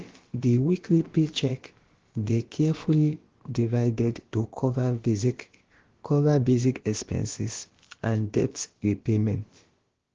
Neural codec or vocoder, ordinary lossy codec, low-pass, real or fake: codec, 16 kHz, about 1 kbps, DyCAST, with the encoder's durations; Opus, 16 kbps; 7.2 kHz; fake